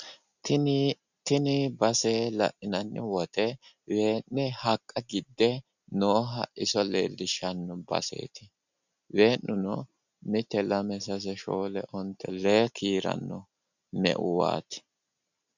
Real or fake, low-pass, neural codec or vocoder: real; 7.2 kHz; none